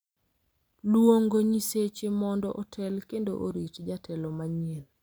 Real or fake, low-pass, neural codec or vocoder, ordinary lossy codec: real; none; none; none